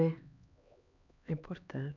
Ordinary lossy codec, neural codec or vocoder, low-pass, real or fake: none; codec, 16 kHz, 2 kbps, X-Codec, HuBERT features, trained on LibriSpeech; 7.2 kHz; fake